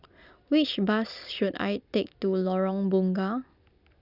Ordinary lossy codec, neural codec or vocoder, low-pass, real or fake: Opus, 64 kbps; none; 5.4 kHz; real